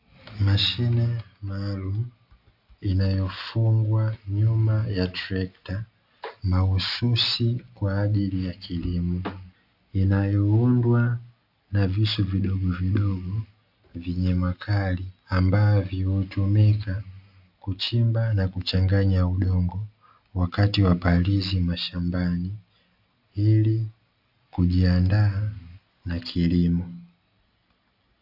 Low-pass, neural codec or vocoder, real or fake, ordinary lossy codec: 5.4 kHz; none; real; AAC, 48 kbps